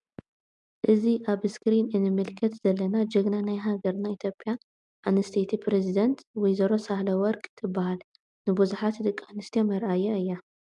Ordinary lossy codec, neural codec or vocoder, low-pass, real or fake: MP3, 96 kbps; none; 10.8 kHz; real